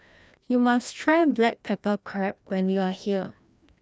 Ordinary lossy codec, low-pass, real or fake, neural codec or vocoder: none; none; fake; codec, 16 kHz, 1 kbps, FreqCodec, larger model